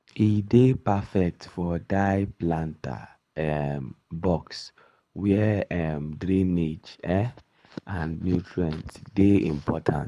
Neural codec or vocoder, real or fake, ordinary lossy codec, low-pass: codec, 24 kHz, 6 kbps, HILCodec; fake; none; none